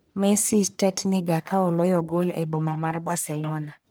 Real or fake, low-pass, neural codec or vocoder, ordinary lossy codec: fake; none; codec, 44.1 kHz, 1.7 kbps, Pupu-Codec; none